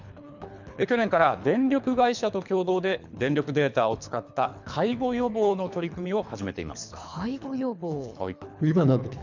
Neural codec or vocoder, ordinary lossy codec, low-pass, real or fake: codec, 24 kHz, 3 kbps, HILCodec; none; 7.2 kHz; fake